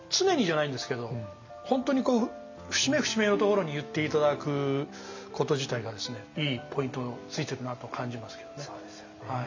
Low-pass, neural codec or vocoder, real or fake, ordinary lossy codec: 7.2 kHz; none; real; MP3, 64 kbps